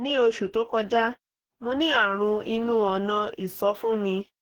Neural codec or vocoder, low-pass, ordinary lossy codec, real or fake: codec, 44.1 kHz, 2.6 kbps, DAC; 19.8 kHz; Opus, 16 kbps; fake